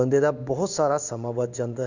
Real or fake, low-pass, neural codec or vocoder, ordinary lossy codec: fake; 7.2 kHz; codec, 16 kHz in and 24 kHz out, 1 kbps, XY-Tokenizer; none